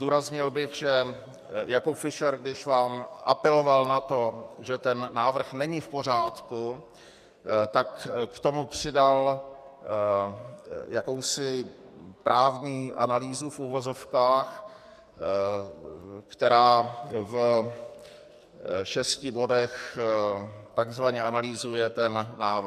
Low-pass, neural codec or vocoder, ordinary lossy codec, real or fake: 14.4 kHz; codec, 44.1 kHz, 2.6 kbps, SNAC; AAC, 96 kbps; fake